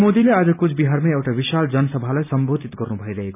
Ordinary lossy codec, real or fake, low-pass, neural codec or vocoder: none; real; 3.6 kHz; none